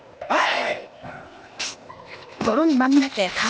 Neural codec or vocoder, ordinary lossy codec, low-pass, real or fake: codec, 16 kHz, 0.8 kbps, ZipCodec; none; none; fake